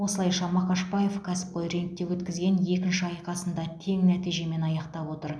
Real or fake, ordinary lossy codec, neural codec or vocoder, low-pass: real; none; none; none